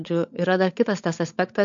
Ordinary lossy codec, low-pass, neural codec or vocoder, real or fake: MP3, 48 kbps; 7.2 kHz; codec, 16 kHz, 16 kbps, FunCodec, trained on LibriTTS, 50 frames a second; fake